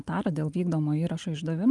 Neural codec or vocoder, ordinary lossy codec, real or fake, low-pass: none; Opus, 32 kbps; real; 10.8 kHz